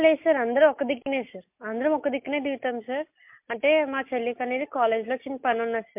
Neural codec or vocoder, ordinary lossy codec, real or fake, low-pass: none; MP3, 32 kbps; real; 3.6 kHz